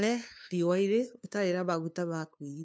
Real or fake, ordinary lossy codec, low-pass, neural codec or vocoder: fake; none; none; codec, 16 kHz, 2 kbps, FunCodec, trained on LibriTTS, 25 frames a second